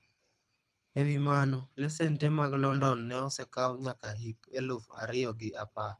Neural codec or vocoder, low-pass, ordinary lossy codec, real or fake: codec, 24 kHz, 3 kbps, HILCodec; none; none; fake